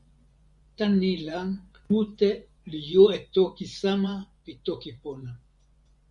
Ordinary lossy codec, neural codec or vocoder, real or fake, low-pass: Opus, 64 kbps; vocoder, 24 kHz, 100 mel bands, Vocos; fake; 10.8 kHz